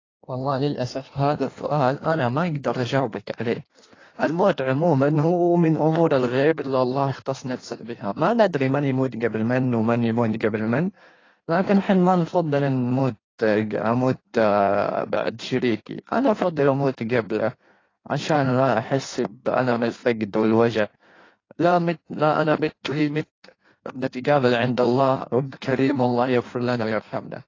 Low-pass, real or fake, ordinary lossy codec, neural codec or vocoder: 7.2 kHz; fake; AAC, 32 kbps; codec, 16 kHz in and 24 kHz out, 1.1 kbps, FireRedTTS-2 codec